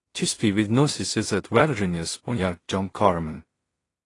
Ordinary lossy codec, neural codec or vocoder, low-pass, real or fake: AAC, 32 kbps; codec, 16 kHz in and 24 kHz out, 0.4 kbps, LongCat-Audio-Codec, two codebook decoder; 10.8 kHz; fake